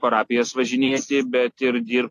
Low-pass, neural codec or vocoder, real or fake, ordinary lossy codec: 14.4 kHz; none; real; AAC, 48 kbps